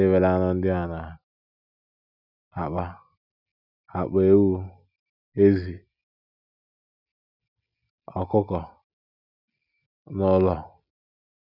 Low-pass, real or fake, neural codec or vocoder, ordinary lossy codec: 5.4 kHz; real; none; none